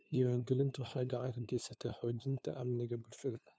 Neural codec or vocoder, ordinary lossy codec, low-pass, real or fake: codec, 16 kHz, 2 kbps, FunCodec, trained on LibriTTS, 25 frames a second; none; none; fake